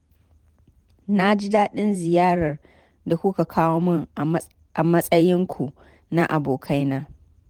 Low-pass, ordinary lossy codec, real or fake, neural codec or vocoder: 19.8 kHz; Opus, 24 kbps; fake; vocoder, 44.1 kHz, 128 mel bands every 512 samples, BigVGAN v2